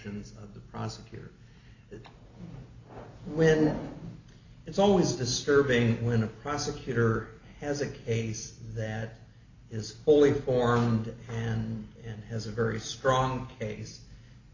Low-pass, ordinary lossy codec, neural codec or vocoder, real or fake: 7.2 kHz; AAC, 48 kbps; none; real